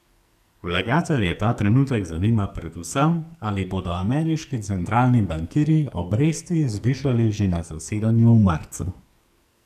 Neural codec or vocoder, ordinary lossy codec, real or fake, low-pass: codec, 44.1 kHz, 2.6 kbps, SNAC; none; fake; 14.4 kHz